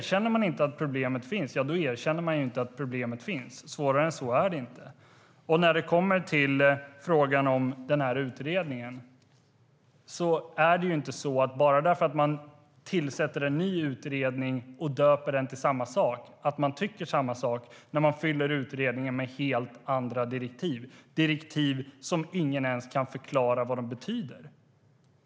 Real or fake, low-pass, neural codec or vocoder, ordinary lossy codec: real; none; none; none